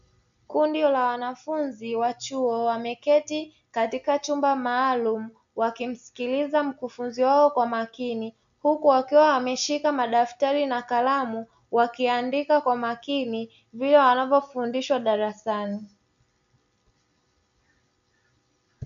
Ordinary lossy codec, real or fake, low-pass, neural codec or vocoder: MP3, 64 kbps; real; 7.2 kHz; none